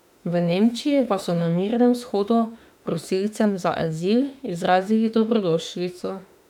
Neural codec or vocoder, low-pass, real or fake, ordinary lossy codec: autoencoder, 48 kHz, 32 numbers a frame, DAC-VAE, trained on Japanese speech; 19.8 kHz; fake; none